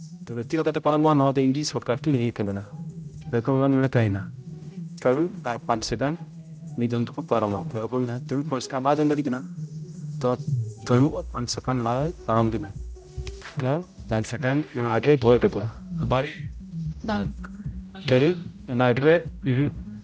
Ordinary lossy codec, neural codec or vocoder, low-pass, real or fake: none; codec, 16 kHz, 0.5 kbps, X-Codec, HuBERT features, trained on general audio; none; fake